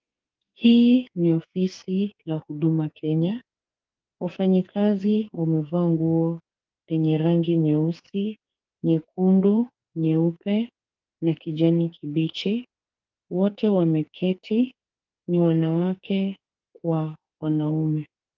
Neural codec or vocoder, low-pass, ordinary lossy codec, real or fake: codec, 44.1 kHz, 2.6 kbps, SNAC; 7.2 kHz; Opus, 32 kbps; fake